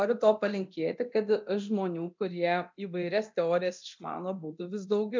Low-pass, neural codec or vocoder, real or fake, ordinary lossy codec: 7.2 kHz; codec, 24 kHz, 0.9 kbps, DualCodec; fake; MP3, 64 kbps